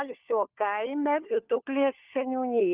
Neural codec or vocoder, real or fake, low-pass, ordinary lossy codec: codec, 16 kHz, 4 kbps, FunCodec, trained on LibriTTS, 50 frames a second; fake; 3.6 kHz; Opus, 24 kbps